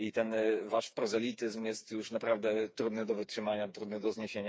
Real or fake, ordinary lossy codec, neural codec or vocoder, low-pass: fake; none; codec, 16 kHz, 4 kbps, FreqCodec, smaller model; none